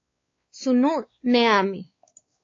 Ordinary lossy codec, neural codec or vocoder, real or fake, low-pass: AAC, 32 kbps; codec, 16 kHz, 4 kbps, X-Codec, WavLM features, trained on Multilingual LibriSpeech; fake; 7.2 kHz